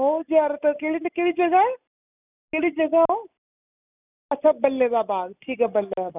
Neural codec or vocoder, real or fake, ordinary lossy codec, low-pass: none; real; none; 3.6 kHz